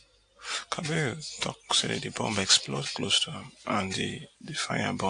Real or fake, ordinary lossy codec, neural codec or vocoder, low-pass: real; AAC, 48 kbps; none; 9.9 kHz